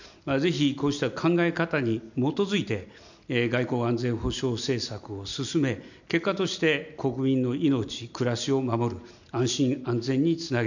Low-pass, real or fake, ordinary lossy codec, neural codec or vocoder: 7.2 kHz; real; none; none